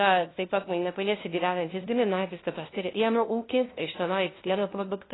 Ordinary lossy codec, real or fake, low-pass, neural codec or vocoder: AAC, 16 kbps; fake; 7.2 kHz; codec, 16 kHz, 0.5 kbps, FunCodec, trained on LibriTTS, 25 frames a second